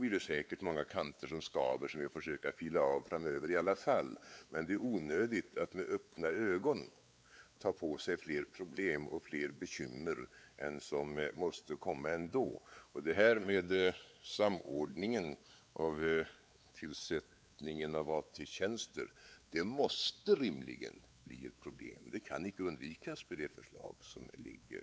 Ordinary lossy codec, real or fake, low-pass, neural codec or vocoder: none; fake; none; codec, 16 kHz, 4 kbps, X-Codec, WavLM features, trained on Multilingual LibriSpeech